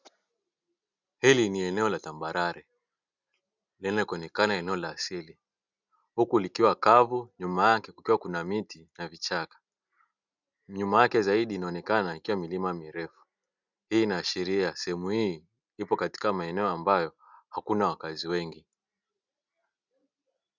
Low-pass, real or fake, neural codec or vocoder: 7.2 kHz; real; none